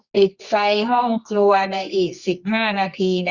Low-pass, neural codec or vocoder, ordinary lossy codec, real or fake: 7.2 kHz; codec, 24 kHz, 0.9 kbps, WavTokenizer, medium music audio release; Opus, 64 kbps; fake